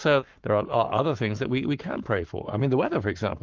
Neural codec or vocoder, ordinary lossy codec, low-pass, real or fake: codec, 44.1 kHz, 7.8 kbps, Pupu-Codec; Opus, 24 kbps; 7.2 kHz; fake